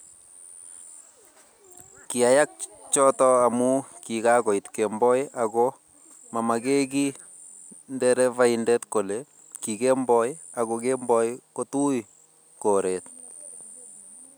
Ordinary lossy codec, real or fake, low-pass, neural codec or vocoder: none; real; none; none